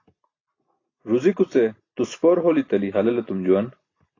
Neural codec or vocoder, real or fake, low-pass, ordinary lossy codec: none; real; 7.2 kHz; AAC, 32 kbps